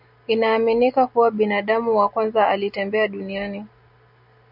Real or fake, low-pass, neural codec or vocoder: real; 5.4 kHz; none